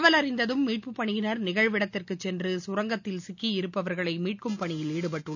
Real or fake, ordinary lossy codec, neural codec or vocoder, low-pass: real; none; none; 7.2 kHz